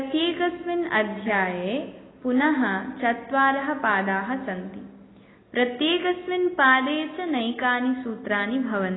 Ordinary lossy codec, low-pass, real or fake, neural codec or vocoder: AAC, 16 kbps; 7.2 kHz; real; none